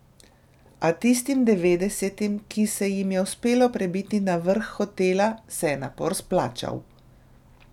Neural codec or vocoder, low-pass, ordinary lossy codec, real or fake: none; 19.8 kHz; none; real